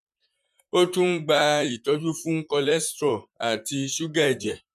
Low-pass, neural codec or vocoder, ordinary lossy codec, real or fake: 14.4 kHz; vocoder, 44.1 kHz, 128 mel bands, Pupu-Vocoder; none; fake